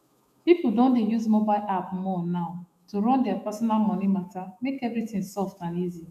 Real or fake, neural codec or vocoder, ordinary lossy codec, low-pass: fake; autoencoder, 48 kHz, 128 numbers a frame, DAC-VAE, trained on Japanese speech; none; 14.4 kHz